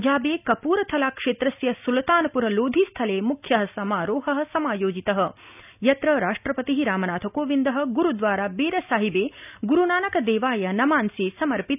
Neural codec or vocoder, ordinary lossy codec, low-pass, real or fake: none; none; 3.6 kHz; real